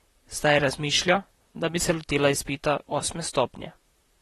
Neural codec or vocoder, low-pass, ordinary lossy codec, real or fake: vocoder, 44.1 kHz, 128 mel bands, Pupu-Vocoder; 19.8 kHz; AAC, 32 kbps; fake